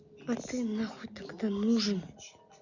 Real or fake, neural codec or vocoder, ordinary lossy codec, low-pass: real; none; Opus, 64 kbps; 7.2 kHz